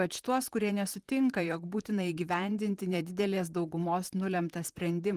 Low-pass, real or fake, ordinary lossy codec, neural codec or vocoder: 14.4 kHz; fake; Opus, 24 kbps; vocoder, 44.1 kHz, 128 mel bands, Pupu-Vocoder